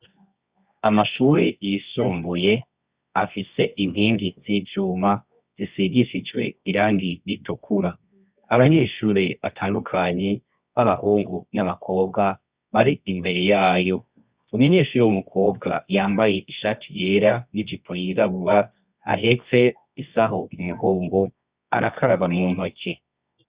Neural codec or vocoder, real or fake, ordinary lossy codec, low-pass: codec, 24 kHz, 0.9 kbps, WavTokenizer, medium music audio release; fake; Opus, 64 kbps; 3.6 kHz